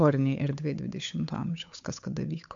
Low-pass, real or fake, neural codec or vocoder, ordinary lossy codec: 7.2 kHz; fake; codec, 16 kHz, 8 kbps, FunCodec, trained on Chinese and English, 25 frames a second; MP3, 64 kbps